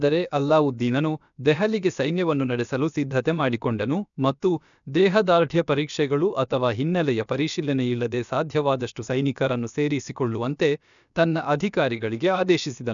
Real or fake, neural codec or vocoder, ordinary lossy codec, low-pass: fake; codec, 16 kHz, about 1 kbps, DyCAST, with the encoder's durations; none; 7.2 kHz